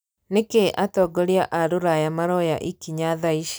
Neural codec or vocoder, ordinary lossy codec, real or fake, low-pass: none; none; real; none